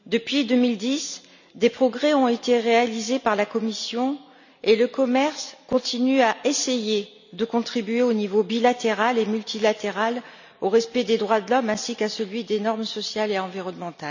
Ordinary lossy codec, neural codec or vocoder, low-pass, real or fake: none; none; 7.2 kHz; real